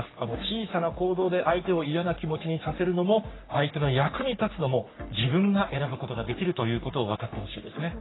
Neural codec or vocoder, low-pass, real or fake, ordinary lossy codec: codec, 44.1 kHz, 3.4 kbps, Pupu-Codec; 7.2 kHz; fake; AAC, 16 kbps